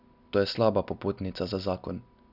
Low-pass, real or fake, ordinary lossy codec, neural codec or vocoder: 5.4 kHz; real; none; none